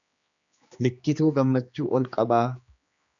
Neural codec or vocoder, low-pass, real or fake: codec, 16 kHz, 2 kbps, X-Codec, HuBERT features, trained on general audio; 7.2 kHz; fake